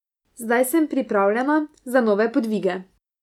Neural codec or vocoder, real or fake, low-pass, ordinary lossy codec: none; real; 19.8 kHz; none